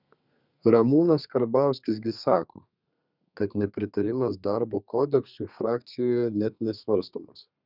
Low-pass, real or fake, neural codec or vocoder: 5.4 kHz; fake; codec, 32 kHz, 1.9 kbps, SNAC